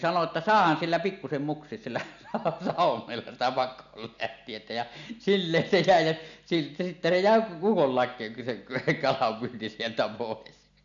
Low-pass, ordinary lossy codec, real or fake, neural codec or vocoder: 7.2 kHz; none; real; none